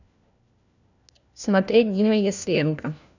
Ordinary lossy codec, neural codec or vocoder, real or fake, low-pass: none; codec, 16 kHz, 1 kbps, FunCodec, trained on LibriTTS, 50 frames a second; fake; 7.2 kHz